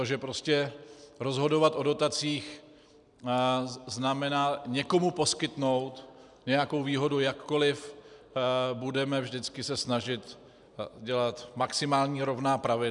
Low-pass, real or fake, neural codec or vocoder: 10.8 kHz; real; none